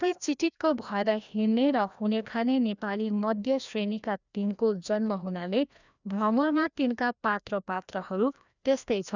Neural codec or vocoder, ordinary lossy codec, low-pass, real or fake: codec, 16 kHz, 1 kbps, FreqCodec, larger model; none; 7.2 kHz; fake